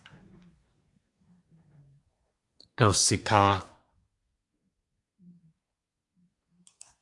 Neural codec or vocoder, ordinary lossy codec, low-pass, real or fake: codec, 24 kHz, 1 kbps, SNAC; MP3, 64 kbps; 10.8 kHz; fake